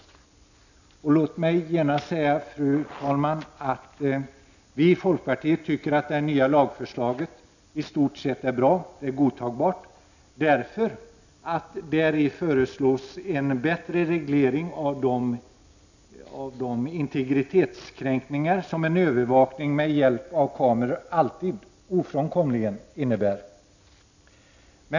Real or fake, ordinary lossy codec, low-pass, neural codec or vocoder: real; none; 7.2 kHz; none